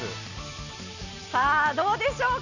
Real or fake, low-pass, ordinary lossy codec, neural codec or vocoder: real; 7.2 kHz; none; none